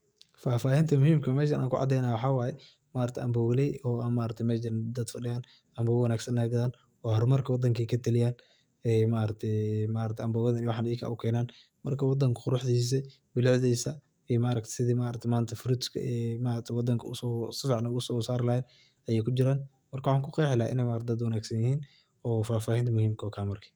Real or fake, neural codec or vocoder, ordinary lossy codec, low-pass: fake; codec, 44.1 kHz, 7.8 kbps, DAC; none; none